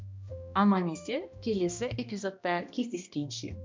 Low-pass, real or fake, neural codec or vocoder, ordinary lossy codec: 7.2 kHz; fake; codec, 16 kHz, 1 kbps, X-Codec, HuBERT features, trained on balanced general audio; MP3, 64 kbps